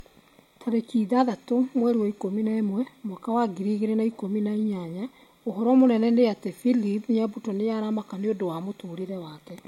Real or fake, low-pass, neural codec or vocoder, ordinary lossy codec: real; 19.8 kHz; none; MP3, 64 kbps